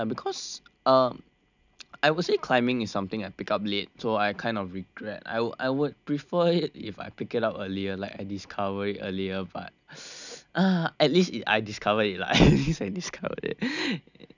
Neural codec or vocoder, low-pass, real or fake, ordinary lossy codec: none; 7.2 kHz; real; none